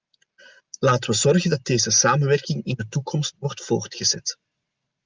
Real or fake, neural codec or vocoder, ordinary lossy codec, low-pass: real; none; Opus, 24 kbps; 7.2 kHz